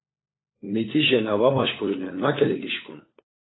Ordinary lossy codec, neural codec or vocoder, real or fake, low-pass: AAC, 16 kbps; codec, 16 kHz, 4 kbps, FunCodec, trained on LibriTTS, 50 frames a second; fake; 7.2 kHz